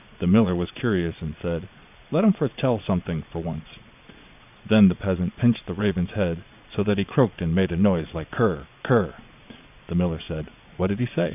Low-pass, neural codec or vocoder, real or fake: 3.6 kHz; vocoder, 22.05 kHz, 80 mel bands, Vocos; fake